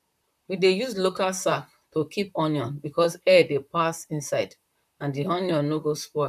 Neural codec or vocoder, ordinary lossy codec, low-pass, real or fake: vocoder, 44.1 kHz, 128 mel bands, Pupu-Vocoder; none; 14.4 kHz; fake